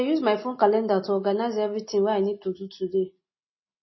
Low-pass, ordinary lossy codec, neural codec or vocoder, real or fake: 7.2 kHz; MP3, 24 kbps; none; real